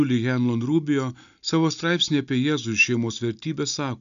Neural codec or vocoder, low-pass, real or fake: none; 7.2 kHz; real